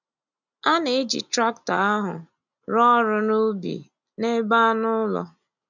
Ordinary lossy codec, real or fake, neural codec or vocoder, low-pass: none; real; none; 7.2 kHz